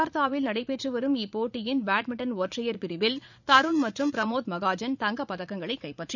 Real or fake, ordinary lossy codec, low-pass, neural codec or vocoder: fake; none; 7.2 kHz; vocoder, 44.1 kHz, 80 mel bands, Vocos